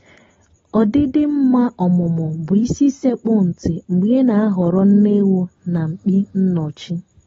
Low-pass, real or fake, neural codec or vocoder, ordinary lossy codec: 7.2 kHz; real; none; AAC, 24 kbps